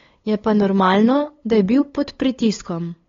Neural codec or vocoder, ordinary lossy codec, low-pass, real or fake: codec, 16 kHz, 8 kbps, FunCodec, trained on LibriTTS, 25 frames a second; AAC, 32 kbps; 7.2 kHz; fake